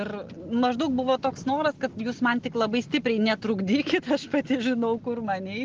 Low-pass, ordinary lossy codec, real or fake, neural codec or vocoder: 7.2 kHz; Opus, 16 kbps; real; none